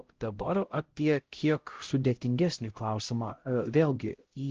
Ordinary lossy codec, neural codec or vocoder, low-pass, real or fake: Opus, 16 kbps; codec, 16 kHz, 0.5 kbps, X-Codec, HuBERT features, trained on LibriSpeech; 7.2 kHz; fake